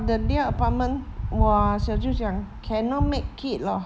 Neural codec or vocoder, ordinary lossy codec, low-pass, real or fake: none; none; none; real